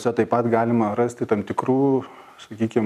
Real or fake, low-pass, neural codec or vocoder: real; 14.4 kHz; none